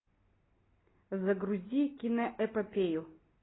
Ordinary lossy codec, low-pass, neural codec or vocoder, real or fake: AAC, 16 kbps; 7.2 kHz; none; real